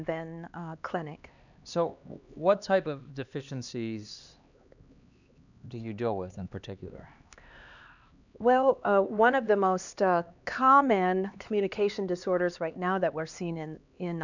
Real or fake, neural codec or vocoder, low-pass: fake; codec, 16 kHz, 2 kbps, X-Codec, HuBERT features, trained on LibriSpeech; 7.2 kHz